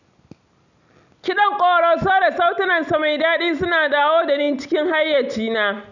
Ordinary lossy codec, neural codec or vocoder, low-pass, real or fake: none; none; 7.2 kHz; real